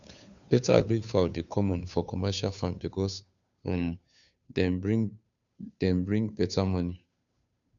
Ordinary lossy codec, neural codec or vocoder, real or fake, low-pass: none; codec, 16 kHz, 2 kbps, FunCodec, trained on Chinese and English, 25 frames a second; fake; 7.2 kHz